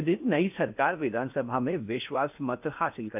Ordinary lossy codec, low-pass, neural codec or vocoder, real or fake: AAC, 32 kbps; 3.6 kHz; codec, 16 kHz in and 24 kHz out, 0.8 kbps, FocalCodec, streaming, 65536 codes; fake